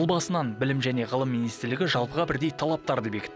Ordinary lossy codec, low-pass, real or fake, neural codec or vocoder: none; none; real; none